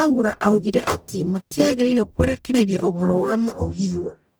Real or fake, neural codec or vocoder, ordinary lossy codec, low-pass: fake; codec, 44.1 kHz, 0.9 kbps, DAC; none; none